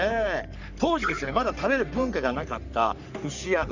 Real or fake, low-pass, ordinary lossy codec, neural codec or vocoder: fake; 7.2 kHz; none; codec, 44.1 kHz, 3.4 kbps, Pupu-Codec